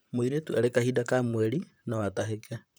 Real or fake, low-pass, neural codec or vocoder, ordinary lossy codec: fake; none; vocoder, 44.1 kHz, 128 mel bands, Pupu-Vocoder; none